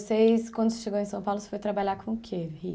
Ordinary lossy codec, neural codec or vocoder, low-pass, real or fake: none; none; none; real